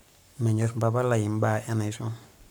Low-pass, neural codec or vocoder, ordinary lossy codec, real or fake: none; codec, 44.1 kHz, 7.8 kbps, Pupu-Codec; none; fake